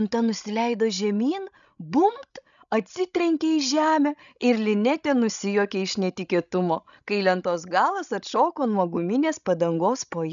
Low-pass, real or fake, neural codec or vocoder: 7.2 kHz; fake; codec, 16 kHz, 16 kbps, FreqCodec, larger model